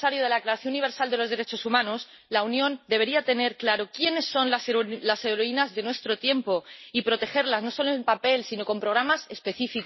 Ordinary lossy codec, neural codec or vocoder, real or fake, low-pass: MP3, 24 kbps; none; real; 7.2 kHz